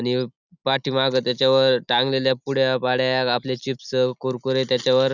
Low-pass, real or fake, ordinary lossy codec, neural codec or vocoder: 7.2 kHz; real; none; none